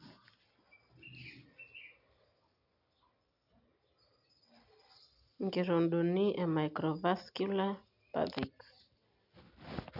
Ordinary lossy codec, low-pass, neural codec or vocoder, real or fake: none; 5.4 kHz; none; real